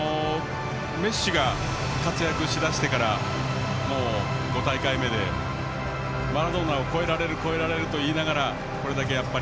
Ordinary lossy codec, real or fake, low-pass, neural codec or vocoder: none; real; none; none